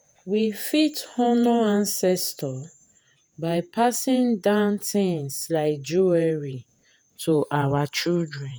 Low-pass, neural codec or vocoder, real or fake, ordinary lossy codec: none; vocoder, 48 kHz, 128 mel bands, Vocos; fake; none